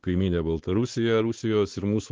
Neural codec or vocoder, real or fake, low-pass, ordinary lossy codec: codec, 16 kHz, 4 kbps, X-Codec, WavLM features, trained on Multilingual LibriSpeech; fake; 7.2 kHz; Opus, 16 kbps